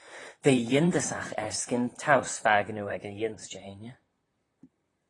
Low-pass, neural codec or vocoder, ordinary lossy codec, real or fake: 10.8 kHz; vocoder, 44.1 kHz, 128 mel bands, Pupu-Vocoder; AAC, 32 kbps; fake